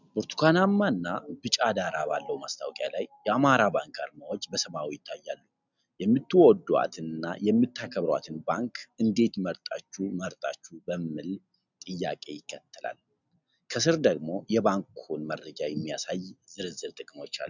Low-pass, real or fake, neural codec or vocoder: 7.2 kHz; real; none